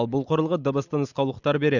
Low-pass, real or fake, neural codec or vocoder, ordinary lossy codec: 7.2 kHz; real; none; none